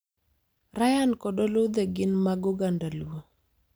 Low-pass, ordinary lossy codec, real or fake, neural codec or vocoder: none; none; real; none